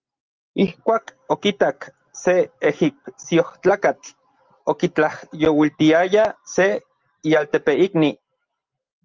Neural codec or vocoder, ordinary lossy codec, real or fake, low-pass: none; Opus, 32 kbps; real; 7.2 kHz